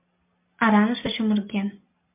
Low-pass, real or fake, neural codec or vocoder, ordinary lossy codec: 3.6 kHz; real; none; MP3, 32 kbps